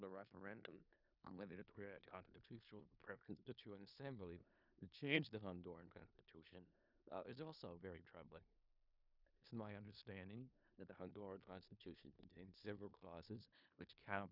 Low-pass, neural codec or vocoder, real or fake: 5.4 kHz; codec, 16 kHz in and 24 kHz out, 0.4 kbps, LongCat-Audio-Codec, four codebook decoder; fake